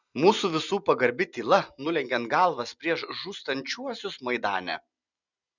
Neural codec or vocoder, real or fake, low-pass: none; real; 7.2 kHz